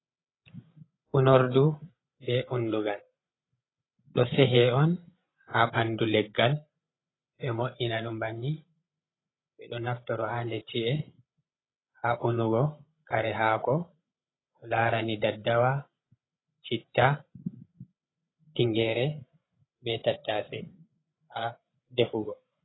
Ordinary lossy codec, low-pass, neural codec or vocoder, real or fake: AAC, 16 kbps; 7.2 kHz; codec, 16 kHz, 16 kbps, FreqCodec, larger model; fake